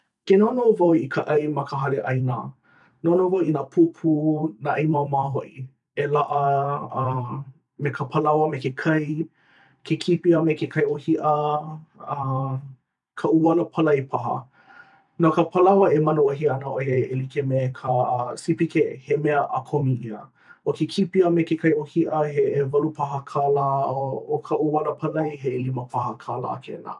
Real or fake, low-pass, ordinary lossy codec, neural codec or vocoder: fake; 10.8 kHz; none; vocoder, 44.1 kHz, 128 mel bands every 512 samples, BigVGAN v2